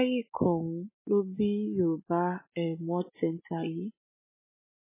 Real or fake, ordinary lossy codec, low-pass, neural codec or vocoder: real; MP3, 16 kbps; 3.6 kHz; none